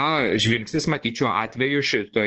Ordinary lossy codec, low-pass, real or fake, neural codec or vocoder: Opus, 16 kbps; 7.2 kHz; fake; codec, 16 kHz, 2 kbps, X-Codec, WavLM features, trained on Multilingual LibriSpeech